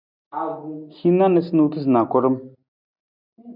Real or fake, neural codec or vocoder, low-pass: real; none; 5.4 kHz